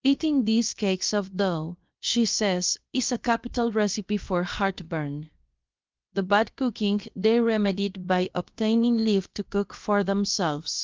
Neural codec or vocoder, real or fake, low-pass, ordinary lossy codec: codec, 16 kHz, about 1 kbps, DyCAST, with the encoder's durations; fake; 7.2 kHz; Opus, 24 kbps